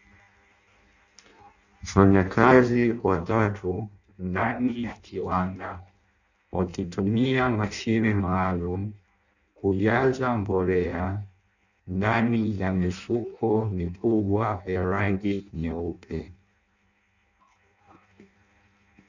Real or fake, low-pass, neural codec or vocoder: fake; 7.2 kHz; codec, 16 kHz in and 24 kHz out, 0.6 kbps, FireRedTTS-2 codec